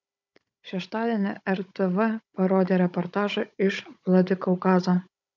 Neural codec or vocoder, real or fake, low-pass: codec, 16 kHz, 16 kbps, FunCodec, trained on Chinese and English, 50 frames a second; fake; 7.2 kHz